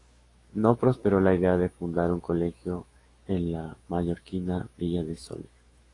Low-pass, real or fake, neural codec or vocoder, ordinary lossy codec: 10.8 kHz; fake; autoencoder, 48 kHz, 128 numbers a frame, DAC-VAE, trained on Japanese speech; AAC, 32 kbps